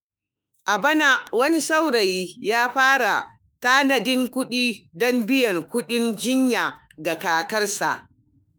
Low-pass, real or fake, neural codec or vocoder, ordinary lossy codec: none; fake; autoencoder, 48 kHz, 32 numbers a frame, DAC-VAE, trained on Japanese speech; none